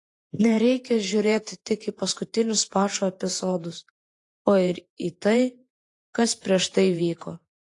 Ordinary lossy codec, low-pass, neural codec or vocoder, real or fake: AAC, 48 kbps; 10.8 kHz; vocoder, 48 kHz, 128 mel bands, Vocos; fake